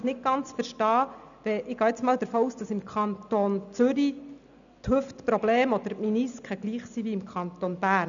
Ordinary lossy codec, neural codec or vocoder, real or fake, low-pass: none; none; real; 7.2 kHz